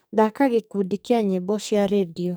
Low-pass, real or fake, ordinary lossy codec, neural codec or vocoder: none; fake; none; codec, 44.1 kHz, 2.6 kbps, SNAC